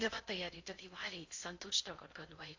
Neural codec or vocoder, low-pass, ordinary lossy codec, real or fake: codec, 16 kHz in and 24 kHz out, 0.8 kbps, FocalCodec, streaming, 65536 codes; 7.2 kHz; AAC, 48 kbps; fake